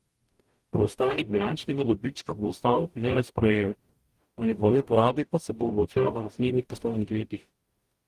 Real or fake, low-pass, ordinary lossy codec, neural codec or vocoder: fake; 14.4 kHz; Opus, 24 kbps; codec, 44.1 kHz, 0.9 kbps, DAC